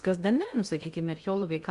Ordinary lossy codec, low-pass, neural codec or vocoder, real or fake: Opus, 64 kbps; 10.8 kHz; codec, 16 kHz in and 24 kHz out, 0.6 kbps, FocalCodec, streaming, 2048 codes; fake